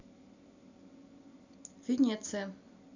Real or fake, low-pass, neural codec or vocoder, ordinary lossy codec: real; 7.2 kHz; none; none